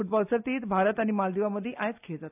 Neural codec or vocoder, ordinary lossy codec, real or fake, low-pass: none; AAC, 32 kbps; real; 3.6 kHz